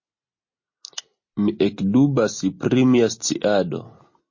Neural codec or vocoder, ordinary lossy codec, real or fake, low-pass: none; MP3, 32 kbps; real; 7.2 kHz